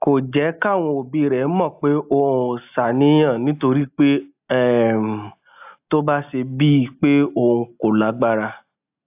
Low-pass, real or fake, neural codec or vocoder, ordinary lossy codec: 3.6 kHz; real; none; AAC, 32 kbps